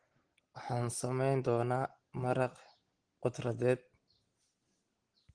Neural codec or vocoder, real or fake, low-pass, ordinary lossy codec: none; real; 9.9 kHz; Opus, 16 kbps